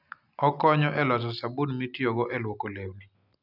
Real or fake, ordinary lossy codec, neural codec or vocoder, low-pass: real; none; none; 5.4 kHz